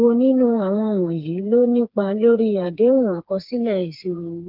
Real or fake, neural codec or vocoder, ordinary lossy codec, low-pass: fake; codec, 16 kHz, 4 kbps, FreqCodec, smaller model; Opus, 32 kbps; 5.4 kHz